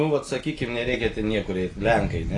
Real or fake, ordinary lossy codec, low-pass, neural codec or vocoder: real; AAC, 32 kbps; 10.8 kHz; none